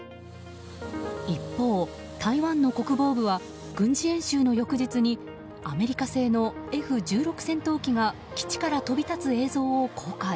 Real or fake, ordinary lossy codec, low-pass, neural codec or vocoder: real; none; none; none